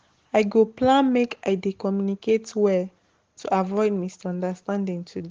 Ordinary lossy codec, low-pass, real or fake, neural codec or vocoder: Opus, 16 kbps; 7.2 kHz; real; none